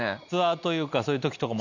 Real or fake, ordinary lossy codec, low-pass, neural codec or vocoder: real; none; 7.2 kHz; none